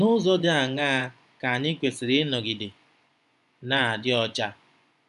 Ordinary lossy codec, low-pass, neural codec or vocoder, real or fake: none; 10.8 kHz; vocoder, 24 kHz, 100 mel bands, Vocos; fake